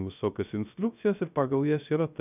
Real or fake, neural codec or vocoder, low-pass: fake; codec, 16 kHz, 0.3 kbps, FocalCodec; 3.6 kHz